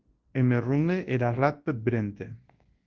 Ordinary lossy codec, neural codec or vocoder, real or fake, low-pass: Opus, 16 kbps; codec, 24 kHz, 0.9 kbps, WavTokenizer, large speech release; fake; 7.2 kHz